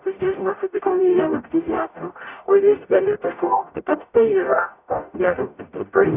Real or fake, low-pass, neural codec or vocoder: fake; 3.6 kHz; codec, 44.1 kHz, 0.9 kbps, DAC